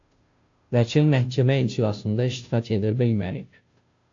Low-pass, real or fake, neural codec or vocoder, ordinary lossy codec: 7.2 kHz; fake; codec, 16 kHz, 0.5 kbps, FunCodec, trained on Chinese and English, 25 frames a second; AAC, 48 kbps